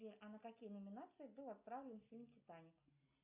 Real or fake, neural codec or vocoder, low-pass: real; none; 3.6 kHz